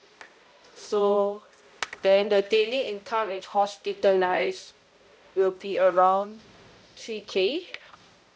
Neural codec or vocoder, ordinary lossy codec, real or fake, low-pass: codec, 16 kHz, 0.5 kbps, X-Codec, HuBERT features, trained on balanced general audio; none; fake; none